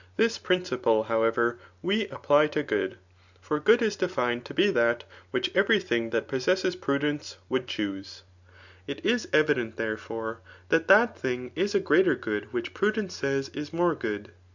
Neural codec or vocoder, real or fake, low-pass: none; real; 7.2 kHz